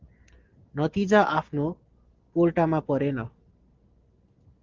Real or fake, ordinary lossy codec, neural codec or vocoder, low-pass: real; Opus, 16 kbps; none; 7.2 kHz